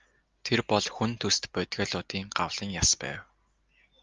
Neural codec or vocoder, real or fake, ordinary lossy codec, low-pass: none; real; Opus, 32 kbps; 7.2 kHz